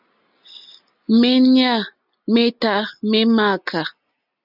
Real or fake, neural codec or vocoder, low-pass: real; none; 5.4 kHz